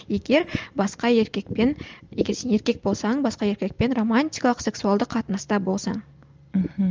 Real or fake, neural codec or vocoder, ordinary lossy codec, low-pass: real; none; Opus, 24 kbps; 7.2 kHz